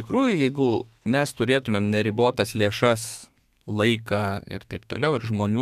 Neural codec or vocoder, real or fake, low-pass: codec, 32 kHz, 1.9 kbps, SNAC; fake; 14.4 kHz